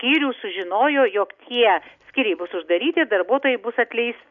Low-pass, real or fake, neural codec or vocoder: 7.2 kHz; real; none